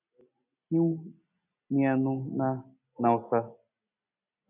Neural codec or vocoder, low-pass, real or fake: none; 3.6 kHz; real